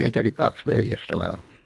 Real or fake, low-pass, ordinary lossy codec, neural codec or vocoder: fake; none; none; codec, 24 kHz, 1.5 kbps, HILCodec